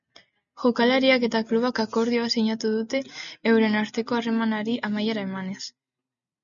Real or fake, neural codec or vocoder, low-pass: real; none; 7.2 kHz